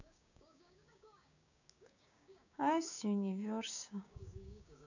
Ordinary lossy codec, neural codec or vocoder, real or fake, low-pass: none; codec, 16 kHz, 6 kbps, DAC; fake; 7.2 kHz